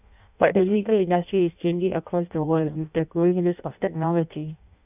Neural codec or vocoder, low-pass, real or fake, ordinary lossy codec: codec, 16 kHz in and 24 kHz out, 0.6 kbps, FireRedTTS-2 codec; 3.6 kHz; fake; none